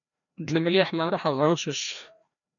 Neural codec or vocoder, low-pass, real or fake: codec, 16 kHz, 1 kbps, FreqCodec, larger model; 7.2 kHz; fake